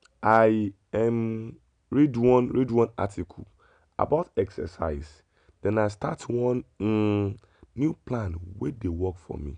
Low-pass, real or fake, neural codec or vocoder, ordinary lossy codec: 9.9 kHz; real; none; none